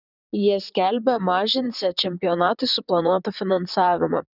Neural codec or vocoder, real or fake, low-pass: vocoder, 44.1 kHz, 128 mel bands, Pupu-Vocoder; fake; 5.4 kHz